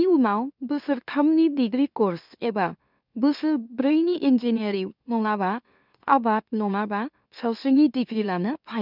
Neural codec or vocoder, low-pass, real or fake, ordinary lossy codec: autoencoder, 44.1 kHz, a latent of 192 numbers a frame, MeloTTS; 5.4 kHz; fake; none